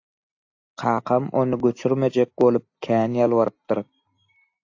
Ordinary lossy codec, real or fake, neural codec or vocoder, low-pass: AAC, 48 kbps; real; none; 7.2 kHz